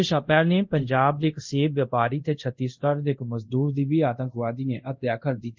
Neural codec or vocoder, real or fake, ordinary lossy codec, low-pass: codec, 24 kHz, 0.5 kbps, DualCodec; fake; Opus, 24 kbps; 7.2 kHz